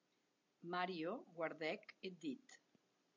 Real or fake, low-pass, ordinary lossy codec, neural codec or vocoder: real; 7.2 kHz; MP3, 48 kbps; none